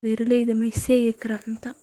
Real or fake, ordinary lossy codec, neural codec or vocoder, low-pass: fake; Opus, 16 kbps; autoencoder, 48 kHz, 32 numbers a frame, DAC-VAE, trained on Japanese speech; 19.8 kHz